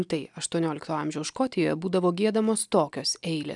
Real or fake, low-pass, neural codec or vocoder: real; 10.8 kHz; none